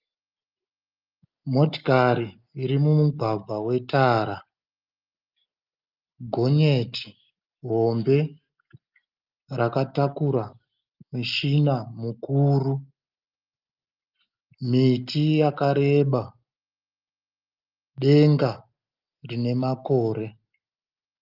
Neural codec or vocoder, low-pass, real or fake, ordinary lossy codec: none; 5.4 kHz; real; Opus, 24 kbps